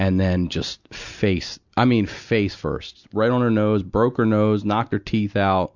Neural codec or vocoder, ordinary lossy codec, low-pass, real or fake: none; Opus, 64 kbps; 7.2 kHz; real